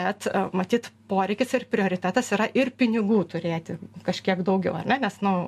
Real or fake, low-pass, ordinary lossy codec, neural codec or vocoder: real; 14.4 kHz; MP3, 64 kbps; none